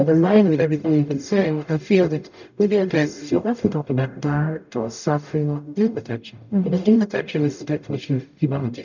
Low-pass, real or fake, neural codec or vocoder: 7.2 kHz; fake; codec, 44.1 kHz, 0.9 kbps, DAC